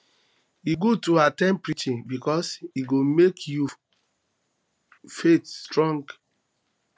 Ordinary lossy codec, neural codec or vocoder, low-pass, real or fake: none; none; none; real